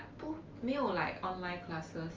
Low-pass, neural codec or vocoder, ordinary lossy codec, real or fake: 7.2 kHz; none; Opus, 32 kbps; real